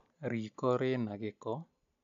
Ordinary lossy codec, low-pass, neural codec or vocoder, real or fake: none; 7.2 kHz; none; real